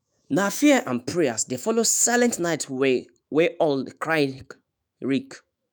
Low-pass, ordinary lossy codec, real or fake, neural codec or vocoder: none; none; fake; autoencoder, 48 kHz, 128 numbers a frame, DAC-VAE, trained on Japanese speech